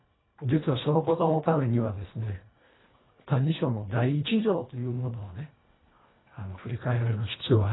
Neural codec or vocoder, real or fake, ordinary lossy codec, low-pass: codec, 24 kHz, 1.5 kbps, HILCodec; fake; AAC, 16 kbps; 7.2 kHz